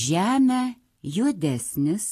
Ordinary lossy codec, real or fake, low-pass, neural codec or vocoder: AAC, 48 kbps; real; 14.4 kHz; none